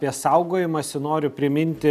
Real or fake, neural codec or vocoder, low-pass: real; none; 14.4 kHz